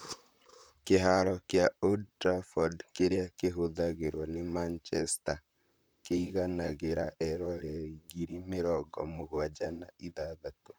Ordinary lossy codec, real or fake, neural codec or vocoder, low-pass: none; fake; vocoder, 44.1 kHz, 128 mel bands, Pupu-Vocoder; none